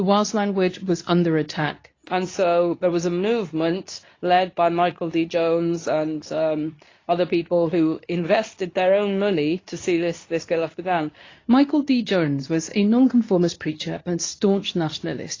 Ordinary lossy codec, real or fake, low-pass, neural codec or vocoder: AAC, 32 kbps; fake; 7.2 kHz; codec, 24 kHz, 0.9 kbps, WavTokenizer, medium speech release version 2